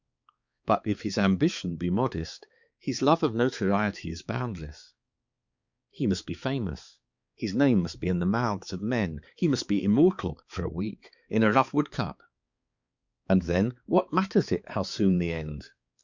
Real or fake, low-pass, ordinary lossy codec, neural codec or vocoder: fake; 7.2 kHz; Opus, 64 kbps; codec, 16 kHz, 4 kbps, X-Codec, HuBERT features, trained on balanced general audio